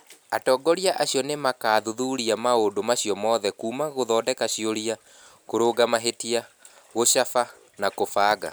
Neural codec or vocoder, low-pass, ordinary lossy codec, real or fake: none; none; none; real